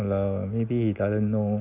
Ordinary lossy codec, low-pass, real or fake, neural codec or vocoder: none; 3.6 kHz; real; none